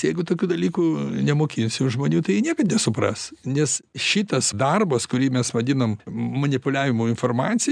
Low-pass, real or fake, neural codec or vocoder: 9.9 kHz; real; none